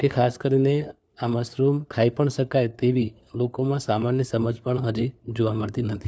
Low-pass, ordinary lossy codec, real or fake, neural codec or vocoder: none; none; fake; codec, 16 kHz, 4 kbps, FunCodec, trained on LibriTTS, 50 frames a second